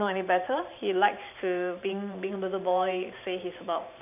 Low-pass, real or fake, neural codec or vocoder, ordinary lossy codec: 3.6 kHz; fake; vocoder, 44.1 kHz, 128 mel bands every 512 samples, BigVGAN v2; none